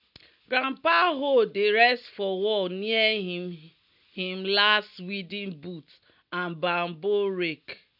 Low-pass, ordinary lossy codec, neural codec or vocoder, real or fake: 5.4 kHz; none; none; real